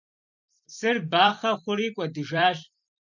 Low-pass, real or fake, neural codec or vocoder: 7.2 kHz; fake; vocoder, 44.1 kHz, 128 mel bands every 256 samples, BigVGAN v2